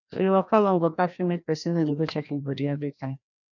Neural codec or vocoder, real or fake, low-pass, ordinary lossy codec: codec, 16 kHz, 1 kbps, FreqCodec, larger model; fake; 7.2 kHz; none